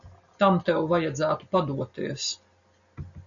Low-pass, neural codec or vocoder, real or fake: 7.2 kHz; none; real